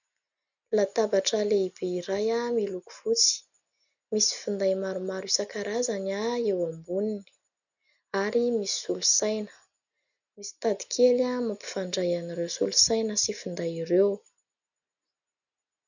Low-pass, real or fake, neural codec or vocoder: 7.2 kHz; real; none